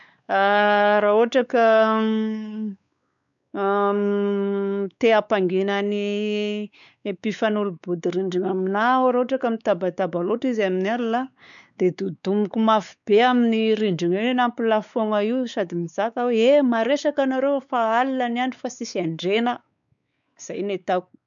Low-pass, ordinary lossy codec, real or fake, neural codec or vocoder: 7.2 kHz; none; fake; codec, 16 kHz, 4 kbps, X-Codec, WavLM features, trained on Multilingual LibriSpeech